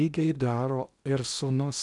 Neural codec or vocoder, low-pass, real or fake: codec, 16 kHz in and 24 kHz out, 0.8 kbps, FocalCodec, streaming, 65536 codes; 10.8 kHz; fake